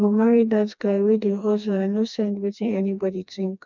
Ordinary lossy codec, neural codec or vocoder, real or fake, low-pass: none; codec, 16 kHz, 2 kbps, FreqCodec, smaller model; fake; 7.2 kHz